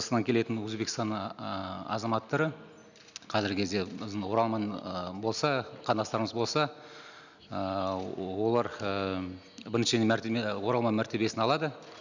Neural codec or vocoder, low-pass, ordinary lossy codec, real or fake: none; 7.2 kHz; none; real